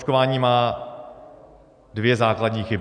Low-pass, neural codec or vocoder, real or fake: 9.9 kHz; none; real